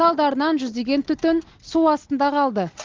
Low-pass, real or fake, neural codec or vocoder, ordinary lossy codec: 7.2 kHz; real; none; Opus, 16 kbps